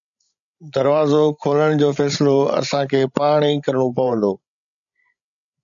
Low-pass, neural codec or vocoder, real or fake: 7.2 kHz; codec, 16 kHz, 16 kbps, FreqCodec, larger model; fake